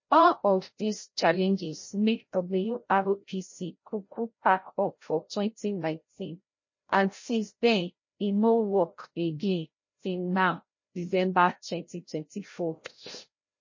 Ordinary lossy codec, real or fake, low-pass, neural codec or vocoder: MP3, 32 kbps; fake; 7.2 kHz; codec, 16 kHz, 0.5 kbps, FreqCodec, larger model